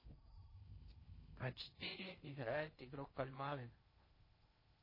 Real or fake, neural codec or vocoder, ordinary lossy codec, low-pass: fake; codec, 16 kHz in and 24 kHz out, 0.6 kbps, FocalCodec, streaming, 4096 codes; MP3, 24 kbps; 5.4 kHz